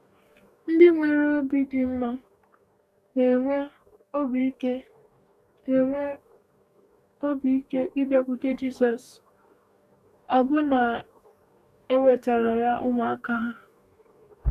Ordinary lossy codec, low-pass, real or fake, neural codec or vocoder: none; 14.4 kHz; fake; codec, 44.1 kHz, 2.6 kbps, DAC